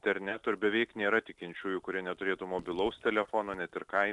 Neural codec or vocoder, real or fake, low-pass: none; real; 10.8 kHz